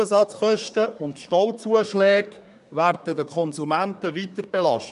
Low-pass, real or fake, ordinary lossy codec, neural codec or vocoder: 10.8 kHz; fake; none; codec, 24 kHz, 1 kbps, SNAC